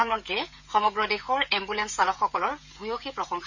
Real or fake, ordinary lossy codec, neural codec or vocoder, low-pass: fake; none; codec, 16 kHz, 16 kbps, FreqCodec, smaller model; 7.2 kHz